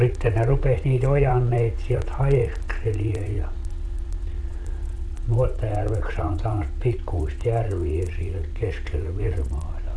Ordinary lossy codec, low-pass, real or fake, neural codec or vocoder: none; none; fake; vocoder, 22.05 kHz, 80 mel bands, Vocos